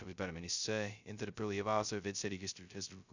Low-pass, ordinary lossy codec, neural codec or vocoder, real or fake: 7.2 kHz; Opus, 64 kbps; codec, 16 kHz, 0.2 kbps, FocalCodec; fake